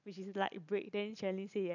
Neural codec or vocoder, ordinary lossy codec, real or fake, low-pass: none; none; real; 7.2 kHz